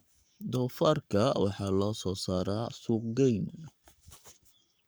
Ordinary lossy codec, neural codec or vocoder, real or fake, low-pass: none; codec, 44.1 kHz, 7.8 kbps, Pupu-Codec; fake; none